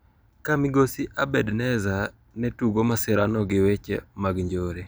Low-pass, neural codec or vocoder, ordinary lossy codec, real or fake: none; none; none; real